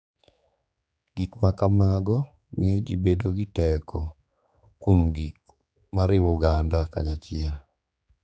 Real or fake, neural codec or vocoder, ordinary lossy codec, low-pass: fake; codec, 16 kHz, 4 kbps, X-Codec, HuBERT features, trained on general audio; none; none